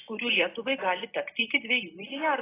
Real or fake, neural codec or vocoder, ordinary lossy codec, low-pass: real; none; AAC, 16 kbps; 3.6 kHz